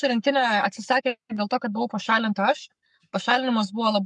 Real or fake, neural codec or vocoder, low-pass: fake; codec, 44.1 kHz, 7.8 kbps, Pupu-Codec; 10.8 kHz